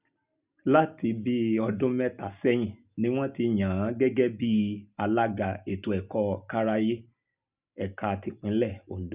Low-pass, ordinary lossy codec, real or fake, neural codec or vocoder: 3.6 kHz; Opus, 64 kbps; real; none